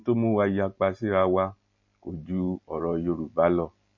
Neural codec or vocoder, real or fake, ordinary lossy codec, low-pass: none; real; MP3, 32 kbps; 7.2 kHz